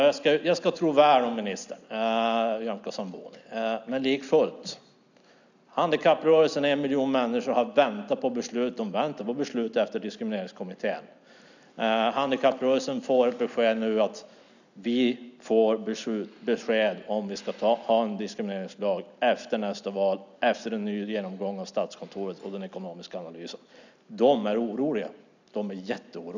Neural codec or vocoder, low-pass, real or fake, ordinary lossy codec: none; 7.2 kHz; real; none